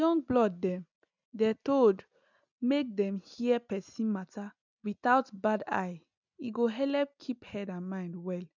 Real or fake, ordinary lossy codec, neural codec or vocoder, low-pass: real; none; none; 7.2 kHz